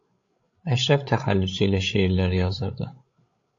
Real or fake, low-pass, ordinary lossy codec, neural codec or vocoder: fake; 7.2 kHz; AAC, 48 kbps; codec, 16 kHz, 8 kbps, FreqCodec, larger model